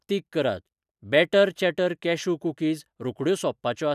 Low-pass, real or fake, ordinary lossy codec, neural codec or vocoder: 14.4 kHz; real; none; none